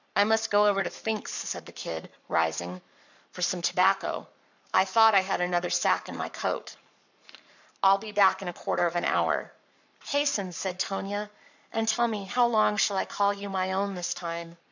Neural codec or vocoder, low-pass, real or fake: codec, 44.1 kHz, 7.8 kbps, Pupu-Codec; 7.2 kHz; fake